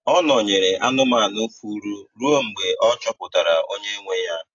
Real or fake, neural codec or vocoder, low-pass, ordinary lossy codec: real; none; 7.2 kHz; AAC, 48 kbps